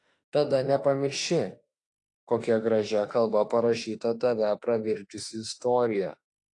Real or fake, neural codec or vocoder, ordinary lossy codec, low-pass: fake; autoencoder, 48 kHz, 32 numbers a frame, DAC-VAE, trained on Japanese speech; AAC, 48 kbps; 10.8 kHz